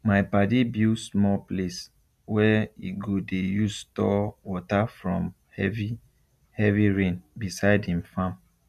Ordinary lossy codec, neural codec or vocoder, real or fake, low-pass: none; none; real; 14.4 kHz